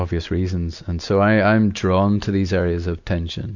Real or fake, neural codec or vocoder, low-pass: real; none; 7.2 kHz